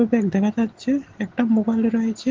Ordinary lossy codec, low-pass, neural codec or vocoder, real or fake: Opus, 16 kbps; 7.2 kHz; none; real